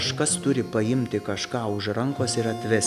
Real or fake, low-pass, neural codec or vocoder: real; 14.4 kHz; none